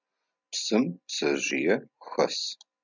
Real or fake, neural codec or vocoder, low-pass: real; none; 7.2 kHz